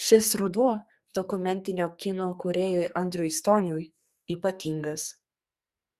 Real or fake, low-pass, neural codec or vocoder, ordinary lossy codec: fake; 14.4 kHz; codec, 44.1 kHz, 2.6 kbps, SNAC; Opus, 64 kbps